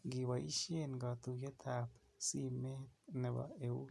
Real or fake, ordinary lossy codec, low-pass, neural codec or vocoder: real; none; none; none